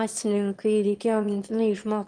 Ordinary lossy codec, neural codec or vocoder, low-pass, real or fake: Opus, 24 kbps; autoencoder, 22.05 kHz, a latent of 192 numbers a frame, VITS, trained on one speaker; 9.9 kHz; fake